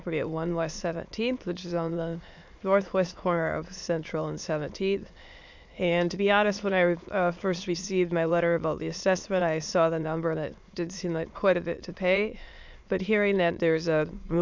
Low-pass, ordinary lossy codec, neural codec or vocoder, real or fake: 7.2 kHz; MP3, 64 kbps; autoencoder, 22.05 kHz, a latent of 192 numbers a frame, VITS, trained on many speakers; fake